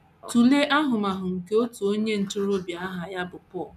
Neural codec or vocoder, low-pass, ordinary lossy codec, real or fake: none; 14.4 kHz; none; real